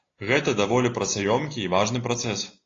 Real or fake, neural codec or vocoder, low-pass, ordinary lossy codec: real; none; 7.2 kHz; AAC, 32 kbps